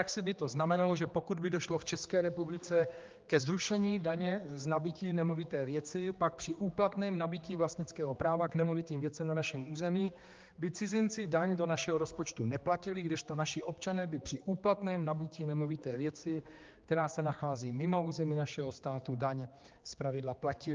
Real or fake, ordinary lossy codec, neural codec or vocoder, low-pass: fake; Opus, 24 kbps; codec, 16 kHz, 2 kbps, X-Codec, HuBERT features, trained on general audio; 7.2 kHz